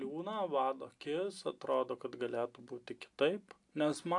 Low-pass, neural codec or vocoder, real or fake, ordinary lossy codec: 10.8 kHz; none; real; AAC, 64 kbps